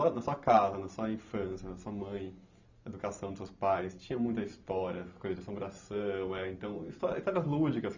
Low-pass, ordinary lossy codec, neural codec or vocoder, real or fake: 7.2 kHz; Opus, 64 kbps; none; real